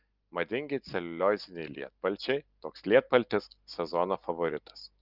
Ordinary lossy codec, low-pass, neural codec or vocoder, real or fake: Opus, 24 kbps; 5.4 kHz; none; real